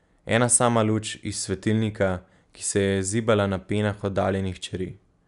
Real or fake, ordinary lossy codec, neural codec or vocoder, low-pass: real; none; none; 10.8 kHz